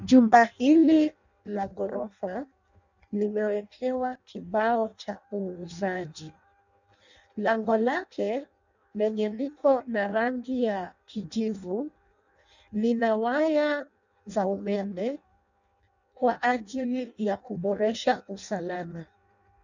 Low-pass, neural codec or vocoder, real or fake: 7.2 kHz; codec, 16 kHz in and 24 kHz out, 0.6 kbps, FireRedTTS-2 codec; fake